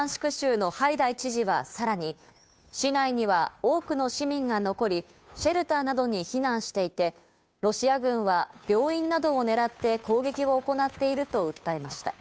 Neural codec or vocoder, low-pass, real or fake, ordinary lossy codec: codec, 16 kHz, 2 kbps, FunCodec, trained on Chinese and English, 25 frames a second; none; fake; none